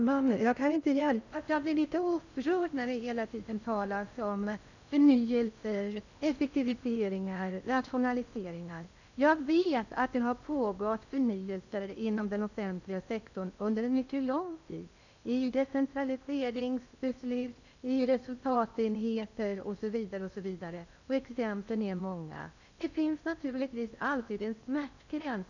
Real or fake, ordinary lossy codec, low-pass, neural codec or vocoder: fake; none; 7.2 kHz; codec, 16 kHz in and 24 kHz out, 0.6 kbps, FocalCodec, streaming, 4096 codes